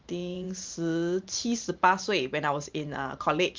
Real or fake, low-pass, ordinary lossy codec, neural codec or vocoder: fake; 7.2 kHz; Opus, 32 kbps; vocoder, 44.1 kHz, 128 mel bands every 512 samples, BigVGAN v2